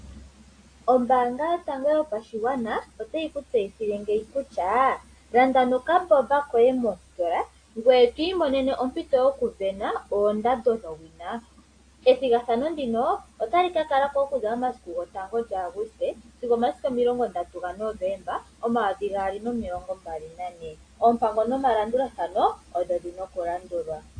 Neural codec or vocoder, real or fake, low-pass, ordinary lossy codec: none; real; 9.9 kHz; AAC, 48 kbps